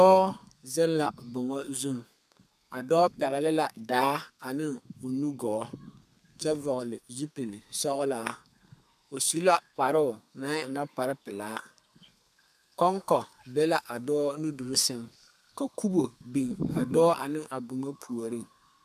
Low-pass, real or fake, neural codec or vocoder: 14.4 kHz; fake; codec, 32 kHz, 1.9 kbps, SNAC